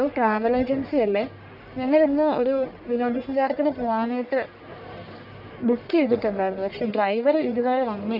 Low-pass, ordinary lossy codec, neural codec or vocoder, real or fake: 5.4 kHz; none; codec, 44.1 kHz, 1.7 kbps, Pupu-Codec; fake